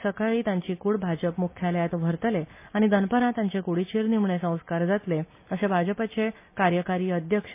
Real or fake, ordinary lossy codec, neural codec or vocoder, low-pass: real; MP3, 24 kbps; none; 3.6 kHz